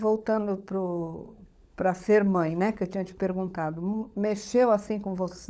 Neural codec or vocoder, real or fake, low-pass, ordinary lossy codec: codec, 16 kHz, 16 kbps, FunCodec, trained on LibriTTS, 50 frames a second; fake; none; none